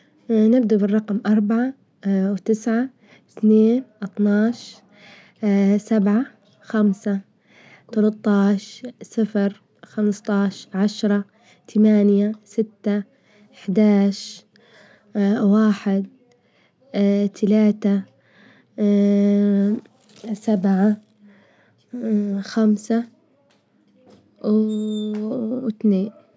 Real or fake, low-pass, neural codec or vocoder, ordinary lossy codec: real; none; none; none